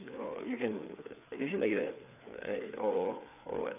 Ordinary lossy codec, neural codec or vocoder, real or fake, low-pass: none; codec, 16 kHz, 4 kbps, FreqCodec, larger model; fake; 3.6 kHz